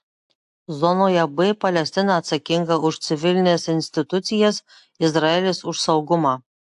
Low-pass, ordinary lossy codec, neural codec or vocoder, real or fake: 10.8 kHz; AAC, 64 kbps; none; real